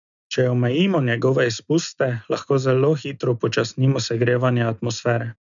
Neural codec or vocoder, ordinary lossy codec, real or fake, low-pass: none; none; real; 7.2 kHz